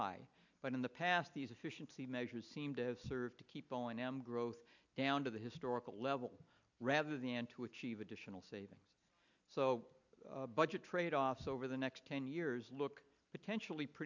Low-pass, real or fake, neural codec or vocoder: 7.2 kHz; real; none